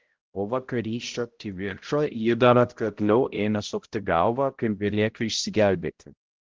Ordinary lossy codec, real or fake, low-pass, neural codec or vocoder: Opus, 16 kbps; fake; 7.2 kHz; codec, 16 kHz, 0.5 kbps, X-Codec, HuBERT features, trained on balanced general audio